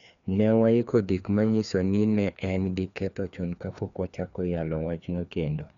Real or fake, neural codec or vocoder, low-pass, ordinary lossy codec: fake; codec, 16 kHz, 2 kbps, FreqCodec, larger model; 7.2 kHz; none